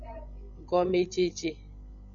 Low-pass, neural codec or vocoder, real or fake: 7.2 kHz; codec, 16 kHz, 16 kbps, FreqCodec, larger model; fake